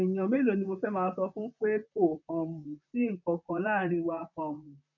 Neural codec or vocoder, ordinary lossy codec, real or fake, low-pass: vocoder, 44.1 kHz, 128 mel bands, Pupu-Vocoder; none; fake; 7.2 kHz